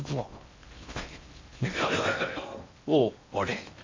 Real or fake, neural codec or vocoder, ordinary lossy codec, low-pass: fake; codec, 16 kHz in and 24 kHz out, 0.6 kbps, FocalCodec, streaming, 4096 codes; MP3, 64 kbps; 7.2 kHz